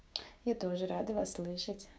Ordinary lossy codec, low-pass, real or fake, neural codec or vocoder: none; none; fake; codec, 16 kHz, 6 kbps, DAC